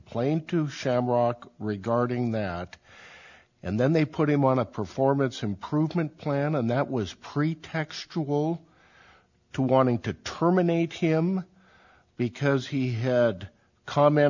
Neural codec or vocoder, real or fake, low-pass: none; real; 7.2 kHz